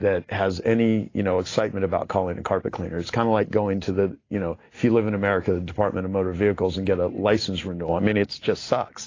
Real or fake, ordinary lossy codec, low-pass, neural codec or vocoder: real; AAC, 32 kbps; 7.2 kHz; none